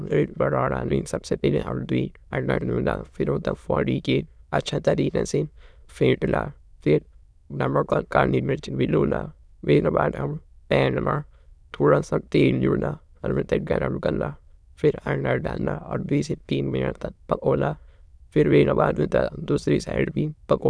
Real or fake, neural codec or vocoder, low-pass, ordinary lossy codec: fake; autoencoder, 22.05 kHz, a latent of 192 numbers a frame, VITS, trained on many speakers; none; none